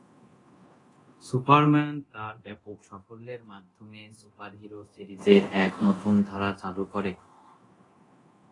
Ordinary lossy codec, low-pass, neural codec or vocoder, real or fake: AAC, 32 kbps; 10.8 kHz; codec, 24 kHz, 0.9 kbps, DualCodec; fake